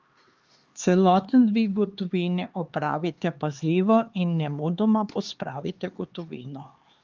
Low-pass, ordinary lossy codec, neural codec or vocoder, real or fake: 7.2 kHz; Opus, 32 kbps; codec, 16 kHz, 2 kbps, X-Codec, HuBERT features, trained on LibriSpeech; fake